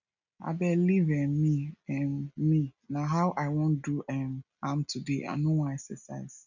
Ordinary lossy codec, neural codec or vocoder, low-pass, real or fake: none; none; 7.2 kHz; real